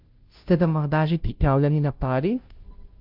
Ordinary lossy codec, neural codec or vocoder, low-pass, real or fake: Opus, 24 kbps; codec, 16 kHz, 0.5 kbps, FunCodec, trained on Chinese and English, 25 frames a second; 5.4 kHz; fake